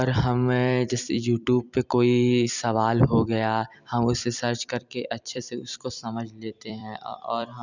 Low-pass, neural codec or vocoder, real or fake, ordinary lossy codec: 7.2 kHz; vocoder, 44.1 kHz, 128 mel bands every 256 samples, BigVGAN v2; fake; none